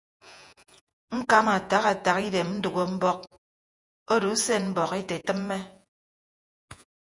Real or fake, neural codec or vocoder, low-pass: fake; vocoder, 48 kHz, 128 mel bands, Vocos; 10.8 kHz